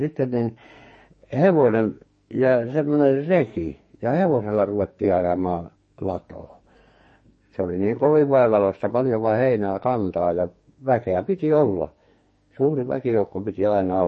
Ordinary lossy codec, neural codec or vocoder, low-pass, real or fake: MP3, 32 kbps; codec, 44.1 kHz, 2.6 kbps, SNAC; 10.8 kHz; fake